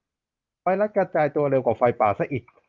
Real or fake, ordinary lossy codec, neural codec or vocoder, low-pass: real; Opus, 32 kbps; none; 7.2 kHz